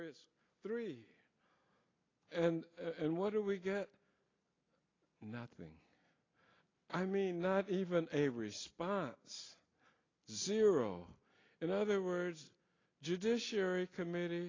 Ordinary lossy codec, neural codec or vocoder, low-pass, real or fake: AAC, 32 kbps; none; 7.2 kHz; real